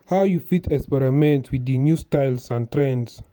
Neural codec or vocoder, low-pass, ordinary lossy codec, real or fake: vocoder, 48 kHz, 128 mel bands, Vocos; none; none; fake